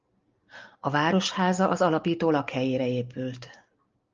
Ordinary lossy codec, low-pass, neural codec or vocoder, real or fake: Opus, 24 kbps; 7.2 kHz; none; real